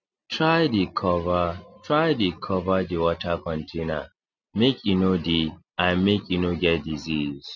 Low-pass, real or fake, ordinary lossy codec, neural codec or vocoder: 7.2 kHz; real; none; none